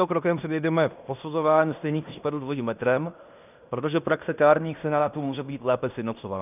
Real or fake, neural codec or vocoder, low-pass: fake; codec, 16 kHz in and 24 kHz out, 0.9 kbps, LongCat-Audio-Codec, fine tuned four codebook decoder; 3.6 kHz